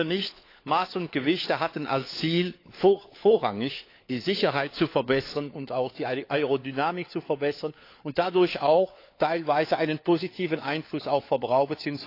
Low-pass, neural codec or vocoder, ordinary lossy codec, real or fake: 5.4 kHz; codec, 16 kHz, 4 kbps, FunCodec, trained on LibriTTS, 50 frames a second; AAC, 32 kbps; fake